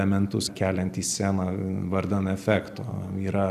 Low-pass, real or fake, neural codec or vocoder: 14.4 kHz; fake; vocoder, 44.1 kHz, 128 mel bands every 256 samples, BigVGAN v2